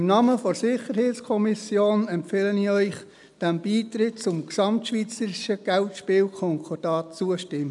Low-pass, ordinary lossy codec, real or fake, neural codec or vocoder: 10.8 kHz; none; real; none